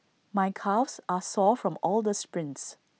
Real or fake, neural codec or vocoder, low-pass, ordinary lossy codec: real; none; none; none